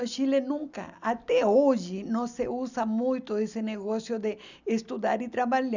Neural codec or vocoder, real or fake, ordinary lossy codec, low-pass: none; real; none; 7.2 kHz